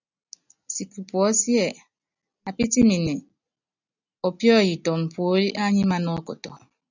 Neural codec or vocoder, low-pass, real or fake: none; 7.2 kHz; real